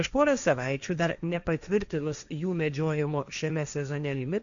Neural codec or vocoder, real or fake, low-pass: codec, 16 kHz, 1.1 kbps, Voila-Tokenizer; fake; 7.2 kHz